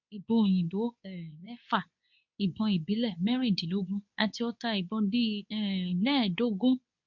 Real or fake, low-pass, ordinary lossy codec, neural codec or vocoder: fake; 7.2 kHz; none; codec, 24 kHz, 0.9 kbps, WavTokenizer, medium speech release version 2